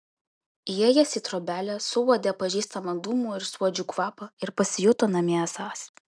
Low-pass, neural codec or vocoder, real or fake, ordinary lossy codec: 9.9 kHz; none; real; MP3, 96 kbps